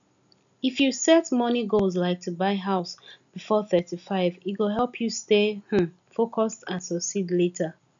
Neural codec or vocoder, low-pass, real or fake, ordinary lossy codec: none; 7.2 kHz; real; MP3, 96 kbps